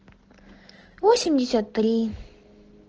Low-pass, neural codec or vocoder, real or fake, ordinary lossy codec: 7.2 kHz; none; real; Opus, 16 kbps